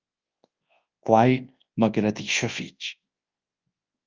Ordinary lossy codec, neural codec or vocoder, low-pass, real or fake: Opus, 24 kbps; codec, 24 kHz, 0.9 kbps, WavTokenizer, large speech release; 7.2 kHz; fake